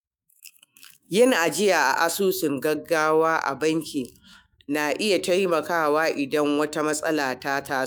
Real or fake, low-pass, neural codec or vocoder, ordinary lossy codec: fake; none; autoencoder, 48 kHz, 128 numbers a frame, DAC-VAE, trained on Japanese speech; none